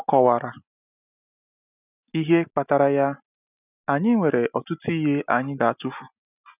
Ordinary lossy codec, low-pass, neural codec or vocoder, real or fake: none; 3.6 kHz; none; real